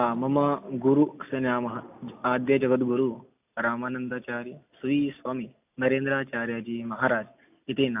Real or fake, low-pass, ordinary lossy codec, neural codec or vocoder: real; 3.6 kHz; AAC, 32 kbps; none